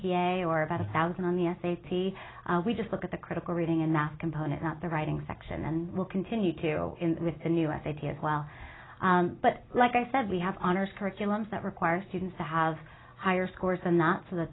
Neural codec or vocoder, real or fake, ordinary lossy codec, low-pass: none; real; AAC, 16 kbps; 7.2 kHz